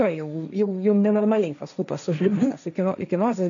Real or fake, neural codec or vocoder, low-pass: fake; codec, 16 kHz, 1.1 kbps, Voila-Tokenizer; 7.2 kHz